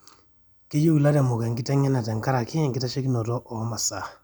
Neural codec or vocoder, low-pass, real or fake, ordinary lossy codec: none; none; real; none